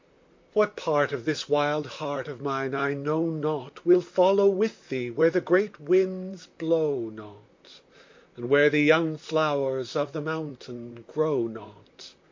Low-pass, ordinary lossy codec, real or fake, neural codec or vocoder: 7.2 kHz; MP3, 64 kbps; fake; vocoder, 44.1 kHz, 128 mel bands, Pupu-Vocoder